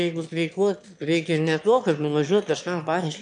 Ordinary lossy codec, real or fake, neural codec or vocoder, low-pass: MP3, 64 kbps; fake; autoencoder, 22.05 kHz, a latent of 192 numbers a frame, VITS, trained on one speaker; 9.9 kHz